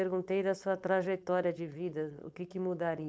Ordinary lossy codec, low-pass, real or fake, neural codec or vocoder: none; none; fake; codec, 16 kHz, 4.8 kbps, FACodec